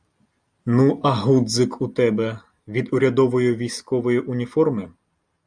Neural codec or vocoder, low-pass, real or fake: none; 9.9 kHz; real